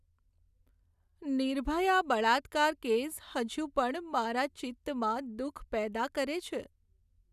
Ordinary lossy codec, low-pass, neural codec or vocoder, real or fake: none; 14.4 kHz; none; real